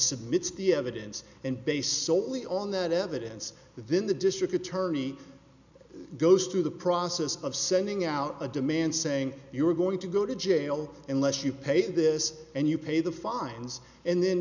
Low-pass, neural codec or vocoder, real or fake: 7.2 kHz; none; real